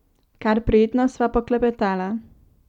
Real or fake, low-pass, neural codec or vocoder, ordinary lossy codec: real; 19.8 kHz; none; none